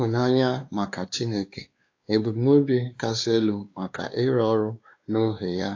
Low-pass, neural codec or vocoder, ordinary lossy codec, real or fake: 7.2 kHz; codec, 16 kHz, 2 kbps, X-Codec, WavLM features, trained on Multilingual LibriSpeech; AAC, 48 kbps; fake